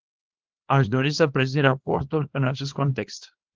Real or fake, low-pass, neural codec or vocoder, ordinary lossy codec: fake; 7.2 kHz; codec, 24 kHz, 0.9 kbps, WavTokenizer, small release; Opus, 16 kbps